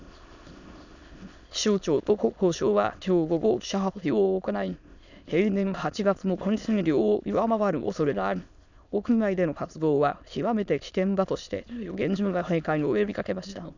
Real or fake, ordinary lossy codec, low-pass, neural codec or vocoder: fake; none; 7.2 kHz; autoencoder, 22.05 kHz, a latent of 192 numbers a frame, VITS, trained on many speakers